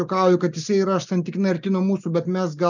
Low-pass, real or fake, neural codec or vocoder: 7.2 kHz; real; none